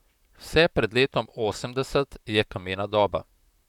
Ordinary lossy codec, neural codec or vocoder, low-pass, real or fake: none; vocoder, 44.1 kHz, 128 mel bands, Pupu-Vocoder; 19.8 kHz; fake